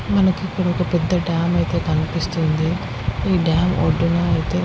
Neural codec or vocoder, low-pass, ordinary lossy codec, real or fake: none; none; none; real